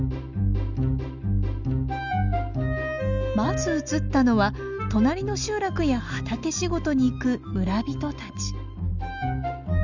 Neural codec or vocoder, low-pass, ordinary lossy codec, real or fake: none; 7.2 kHz; none; real